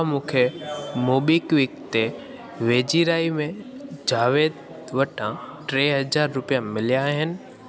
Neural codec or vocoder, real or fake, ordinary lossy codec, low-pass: none; real; none; none